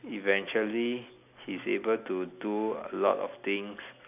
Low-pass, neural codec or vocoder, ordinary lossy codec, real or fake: 3.6 kHz; none; none; real